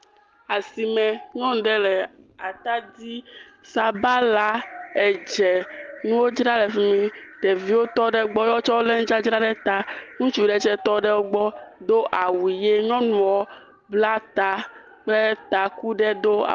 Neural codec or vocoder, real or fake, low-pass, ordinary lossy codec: none; real; 7.2 kHz; Opus, 32 kbps